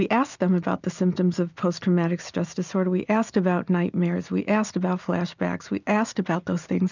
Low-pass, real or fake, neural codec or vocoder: 7.2 kHz; real; none